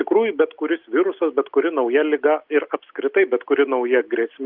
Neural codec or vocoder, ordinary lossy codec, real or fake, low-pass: none; Opus, 32 kbps; real; 5.4 kHz